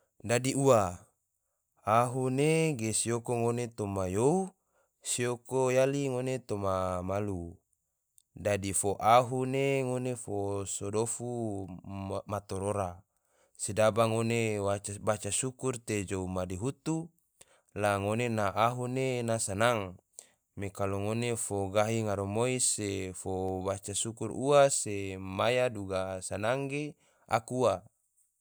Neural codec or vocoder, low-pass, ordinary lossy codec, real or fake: none; none; none; real